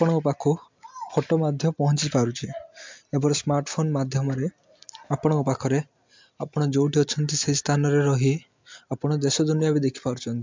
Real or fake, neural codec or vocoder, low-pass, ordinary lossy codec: real; none; 7.2 kHz; MP3, 64 kbps